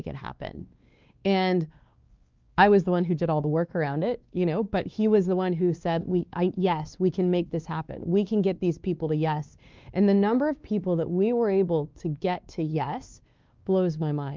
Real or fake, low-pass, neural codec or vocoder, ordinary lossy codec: fake; 7.2 kHz; codec, 16 kHz, 2 kbps, X-Codec, WavLM features, trained on Multilingual LibriSpeech; Opus, 24 kbps